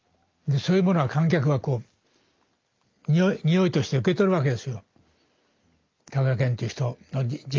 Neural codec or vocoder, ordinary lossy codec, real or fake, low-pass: none; Opus, 24 kbps; real; 7.2 kHz